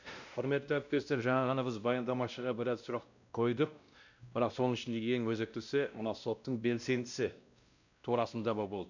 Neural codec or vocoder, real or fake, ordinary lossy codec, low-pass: codec, 16 kHz, 1 kbps, X-Codec, WavLM features, trained on Multilingual LibriSpeech; fake; none; 7.2 kHz